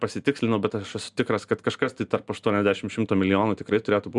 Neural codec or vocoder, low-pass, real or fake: none; 10.8 kHz; real